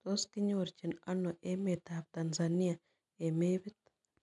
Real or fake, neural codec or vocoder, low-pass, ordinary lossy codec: real; none; 10.8 kHz; none